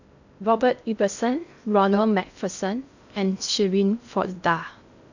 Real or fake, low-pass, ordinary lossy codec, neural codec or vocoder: fake; 7.2 kHz; none; codec, 16 kHz in and 24 kHz out, 0.6 kbps, FocalCodec, streaming, 2048 codes